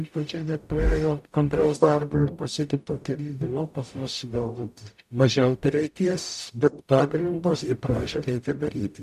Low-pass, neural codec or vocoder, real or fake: 14.4 kHz; codec, 44.1 kHz, 0.9 kbps, DAC; fake